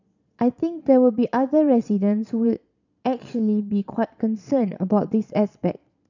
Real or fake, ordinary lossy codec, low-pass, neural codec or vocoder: fake; none; 7.2 kHz; vocoder, 22.05 kHz, 80 mel bands, Vocos